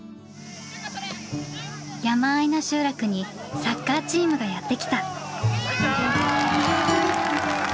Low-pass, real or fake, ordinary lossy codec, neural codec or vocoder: none; real; none; none